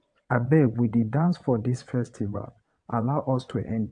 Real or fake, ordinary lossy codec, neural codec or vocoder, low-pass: fake; AAC, 64 kbps; vocoder, 22.05 kHz, 80 mel bands, WaveNeXt; 9.9 kHz